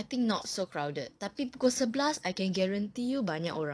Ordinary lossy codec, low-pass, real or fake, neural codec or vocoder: none; none; fake; vocoder, 22.05 kHz, 80 mel bands, Vocos